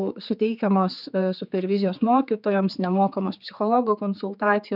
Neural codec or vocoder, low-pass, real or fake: codec, 16 kHz, 4 kbps, X-Codec, HuBERT features, trained on general audio; 5.4 kHz; fake